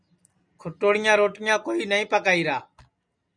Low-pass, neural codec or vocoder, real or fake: 9.9 kHz; none; real